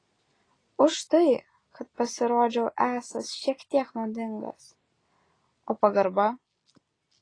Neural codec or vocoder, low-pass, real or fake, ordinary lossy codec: none; 9.9 kHz; real; AAC, 32 kbps